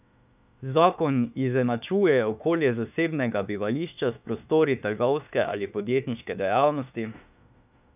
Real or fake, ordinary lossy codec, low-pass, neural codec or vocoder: fake; none; 3.6 kHz; autoencoder, 48 kHz, 32 numbers a frame, DAC-VAE, trained on Japanese speech